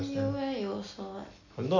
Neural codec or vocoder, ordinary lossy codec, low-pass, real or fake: none; none; 7.2 kHz; real